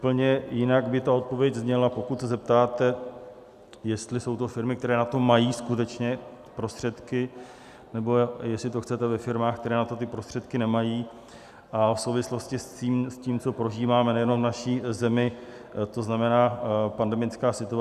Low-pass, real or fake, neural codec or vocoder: 14.4 kHz; real; none